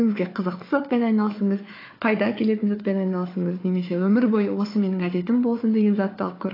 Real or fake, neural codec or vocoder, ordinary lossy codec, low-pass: fake; codec, 16 kHz, 4 kbps, FunCodec, trained on Chinese and English, 50 frames a second; AAC, 24 kbps; 5.4 kHz